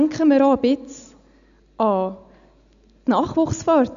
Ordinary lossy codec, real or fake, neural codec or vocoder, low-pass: none; real; none; 7.2 kHz